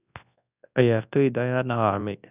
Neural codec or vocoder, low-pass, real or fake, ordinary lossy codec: codec, 24 kHz, 0.9 kbps, WavTokenizer, large speech release; 3.6 kHz; fake; none